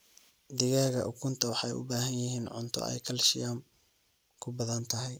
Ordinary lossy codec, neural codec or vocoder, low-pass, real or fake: none; none; none; real